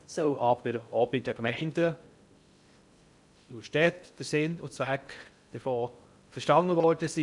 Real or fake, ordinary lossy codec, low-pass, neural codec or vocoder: fake; none; 10.8 kHz; codec, 16 kHz in and 24 kHz out, 0.6 kbps, FocalCodec, streaming, 2048 codes